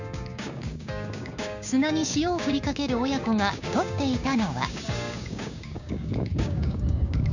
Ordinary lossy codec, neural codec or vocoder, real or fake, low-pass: none; codec, 16 kHz, 6 kbps, DAC; fake; 7.2 kHz